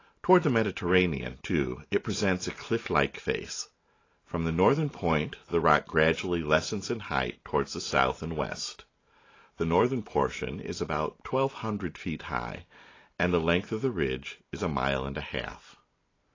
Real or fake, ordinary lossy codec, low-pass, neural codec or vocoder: real; AAC, 32 kbps; 7.2 kHz; none